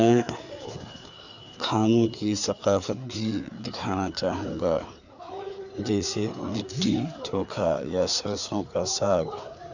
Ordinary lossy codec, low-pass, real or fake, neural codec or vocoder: none; 7.2 kHz; fake; codec, 16 kHz, 4 kbps, FreqCodec, larger model